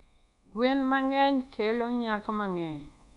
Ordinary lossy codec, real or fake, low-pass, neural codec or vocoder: none; fake; 10.8 kHz; codec, 24 kHz, 1.2 kbps, DualCodec